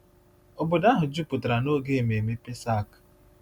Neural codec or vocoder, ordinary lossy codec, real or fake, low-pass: none; none; real; 19.8 kHz